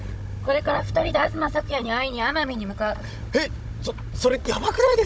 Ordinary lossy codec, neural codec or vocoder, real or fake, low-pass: none; codec, 16 kHz, 16 kbps, FunCodec, trained on Chinese and English, 50 frames a second; fake; none